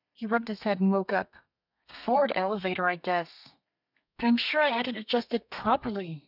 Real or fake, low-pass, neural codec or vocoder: fake; 5.4 kHz; codec, 32 kHz, 1.9 kbps, SNAC